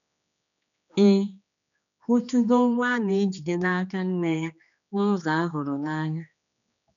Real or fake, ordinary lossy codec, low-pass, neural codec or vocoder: fake; none; 7.2 kHz; codec, 16 kHz, 2 kbps, X-Codec, HuBERT features, trained on general audio